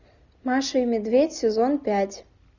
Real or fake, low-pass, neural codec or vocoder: real; 7.2 kHz; none